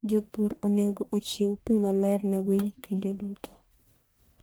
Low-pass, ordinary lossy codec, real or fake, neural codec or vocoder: none; none; fake; codec, 44.1 kHz, 1.7 kbps, Pupu-Codec